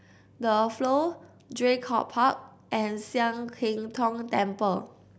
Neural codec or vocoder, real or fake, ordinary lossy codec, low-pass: none; real; none; none